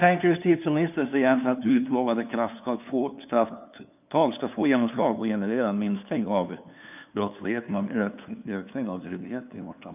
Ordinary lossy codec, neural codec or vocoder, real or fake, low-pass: none; codec, 16 kHz, 2 kbps, FunCodec, trained on LibriTTS, 25 frames a second; fake; 3.6 kHz